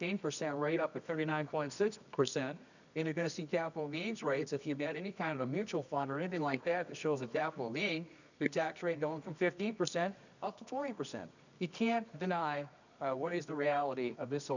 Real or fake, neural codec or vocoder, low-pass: fake; codec, 24 kHz, 0.9 kbps, WavTokenizer, medium music audio release; 7.2 kHz